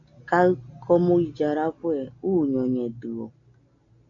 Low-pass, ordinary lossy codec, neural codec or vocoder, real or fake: 7.2 kHz; MP3, 64 kbps; none; real